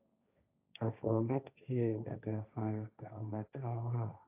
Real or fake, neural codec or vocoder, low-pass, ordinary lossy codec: fake; codec, 16 kHz, 1.1 kbps, Voila-Tokenizer; 3.6 kHz; AAC, 32 kbps